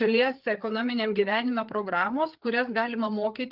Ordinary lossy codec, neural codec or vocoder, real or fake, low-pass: Opus, 32 kbps; codec, 16 kHz, 4 kbps, FreqCodec, larger model; fake; 5.4 kHz